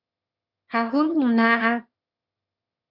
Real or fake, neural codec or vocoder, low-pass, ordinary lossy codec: fake; autoencoder, 22.05 kHz, a latent of 192 numbers a frame, VITS, trained on one speaker; 5.4 kHz; Opus, 64 kbps